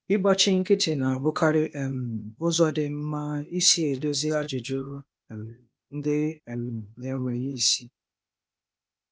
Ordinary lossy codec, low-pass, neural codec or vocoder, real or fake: none; none; codec, 16 kHz, 0.8 kbps, ZipCodec; fake